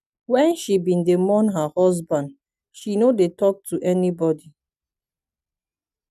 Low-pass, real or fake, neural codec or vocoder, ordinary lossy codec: 14.4 kHz; real; none; none